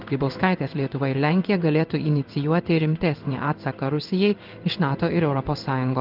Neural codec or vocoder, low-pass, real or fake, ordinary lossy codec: codec, 16 kHz in and 24 kHz out, 1 kbps, XY-Tokenizer; 5.4 kHz; fake; Opus, 32 kbps